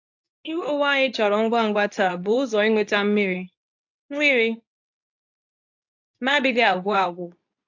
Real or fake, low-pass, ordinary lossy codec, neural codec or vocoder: fake; 7.2 kHz; AAC, 48 kbps; codec, 24 kHz, 0.9 kbps, WavTokenizer, medium speech release version 2